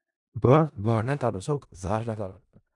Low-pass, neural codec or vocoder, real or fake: 10.8 kHz; codec, 16 kHz in and 24 kHz out, 0.4 kbps, LongCat-Audio-Codec, four codebook decoder; fake